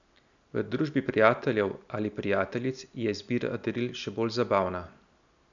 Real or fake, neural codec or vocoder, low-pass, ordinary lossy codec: real; none; 7.2 kHz; none